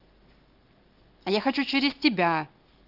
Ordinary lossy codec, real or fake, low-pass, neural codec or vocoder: Opus, 32 kbps; real; 5.4 kHz; none